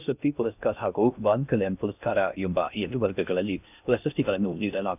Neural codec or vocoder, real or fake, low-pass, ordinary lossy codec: codec, 16 kHz in and 24 kHz out, 0.6 kbps, FocalCodec, streaming, 2048 codes; fake; 3.6 kHz; none